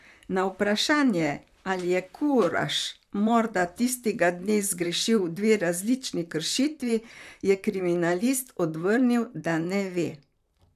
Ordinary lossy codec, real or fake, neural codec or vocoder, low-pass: none; fake; vocoder, 44.1 kHz, 128 mel bands, Pupu-Vocoder; 14.4 kHz